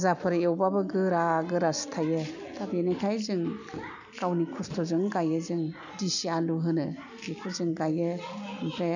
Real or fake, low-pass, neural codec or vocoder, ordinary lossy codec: real; 7.2 kHz; none; none